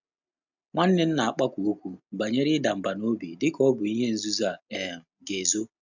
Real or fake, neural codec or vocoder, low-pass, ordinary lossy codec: real; none; 7.2 kHz; none